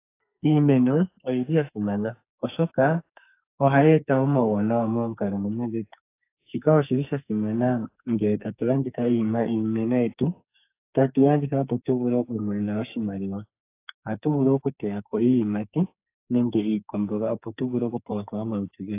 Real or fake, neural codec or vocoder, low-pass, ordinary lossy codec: fake; codec, 44.1 kHz, 2.6 kbps, SNAC; 3.6 kHz; AAC, 24 kbps